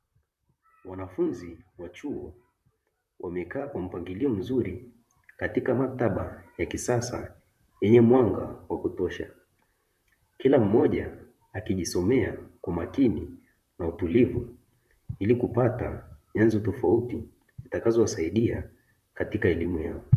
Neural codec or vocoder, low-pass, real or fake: vocoder, 44.1 kHz, 128 mel bands, Pupu-Vocoder; 14.4 kHz; fake